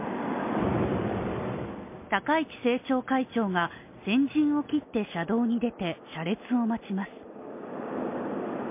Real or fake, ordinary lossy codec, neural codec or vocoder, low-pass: real; MP3, 24 kbps; none; 3.6 kHz